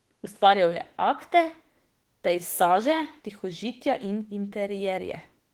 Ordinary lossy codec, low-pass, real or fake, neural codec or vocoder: Opus, 16 kbps; 19.8 kHz; fake; autoencoder, 48 kHz, 32 numbers a frame, DAC-VAE, trained on Japanese speech